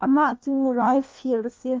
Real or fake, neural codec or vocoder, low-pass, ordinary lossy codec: fake; codec, 16 kHz, 1 kbps, FunCodec, trained on LibriTTS, 50 frames a second; 7.2 kHz; Opus, 32 kbps